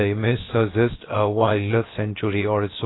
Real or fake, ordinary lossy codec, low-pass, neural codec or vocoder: fake; AAC, 16 kbps; 7.2 kHz; codec, 16 kHz, about 1 kbps, DyCAST, with the encoder's durations